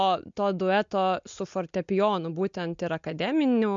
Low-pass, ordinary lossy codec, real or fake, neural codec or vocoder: 7.2 kHz; MP3, 64 kbps; real; none